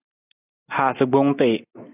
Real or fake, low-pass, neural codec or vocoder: real; 3.6 kHz; none